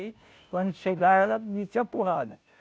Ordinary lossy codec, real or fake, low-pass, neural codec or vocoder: none; fake; none; codec, 16 kHz, 0.5 kbps, FunCodec, trained on Chinese and English, 25 frames a second